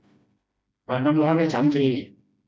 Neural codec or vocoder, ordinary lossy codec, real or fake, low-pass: codec, 16 kHz, 1 kbps, FreqCodec, smaller model; none; fake; none